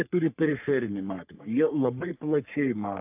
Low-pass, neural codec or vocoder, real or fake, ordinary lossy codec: 3.6 kHz; codec, 44.1 kHz, 3.4 kbps, Pupu-Codec; fake; AAC, 32 kbps